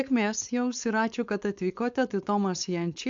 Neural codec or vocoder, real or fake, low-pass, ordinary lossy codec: codec, 16 kHz, 4.8 kbps, FACodec; fake; 7.2 kHz; AAC, 64 kbps